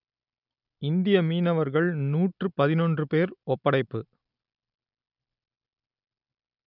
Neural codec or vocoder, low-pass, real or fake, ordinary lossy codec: none; 5.4 kHz; real; none